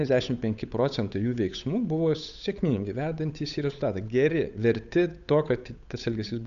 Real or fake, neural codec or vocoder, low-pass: fake; codec, 16 kHz, 8 kbps, FunCodec, trained on Chinese and English, 25 frames a second; 7.2 kHz